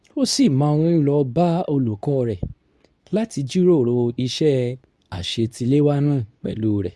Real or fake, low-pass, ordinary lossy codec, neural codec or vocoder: fake; none; none; codec, 24 kHz, 0.9 kbps, WavTokenizer, medium speech release version 2